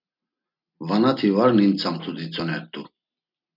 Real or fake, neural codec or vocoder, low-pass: real; none; 5.4 kHz